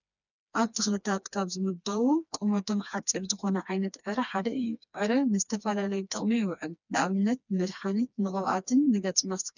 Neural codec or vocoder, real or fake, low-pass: codec, 16 kHz, 2 kbps, FreqCodec, smaller model; fake; 7.2 kHz